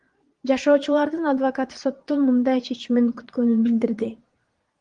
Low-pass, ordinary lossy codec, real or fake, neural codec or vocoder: 9.9 kHz; Opus, 24 kbps; fake; vocoder, 22.05 kHz, 80 mel bands, Vocos